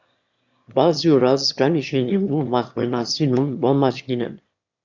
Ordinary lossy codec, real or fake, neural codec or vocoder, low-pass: Opus, 64 kbps; fake; autoencoder, 22.05 kHz, a latent of 192 numbers a frame, VITS, trained on one speaker; 7.2 kHz